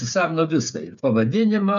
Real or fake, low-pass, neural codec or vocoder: fake; 7.2 kHz; codec, 16 kHz, 8 kbps, FreqCodec, smaller model